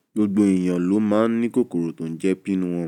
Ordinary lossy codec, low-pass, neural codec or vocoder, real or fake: none; 19.8 kHz; none; real